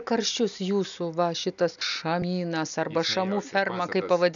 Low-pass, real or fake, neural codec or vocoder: 7.2 kHz; real; none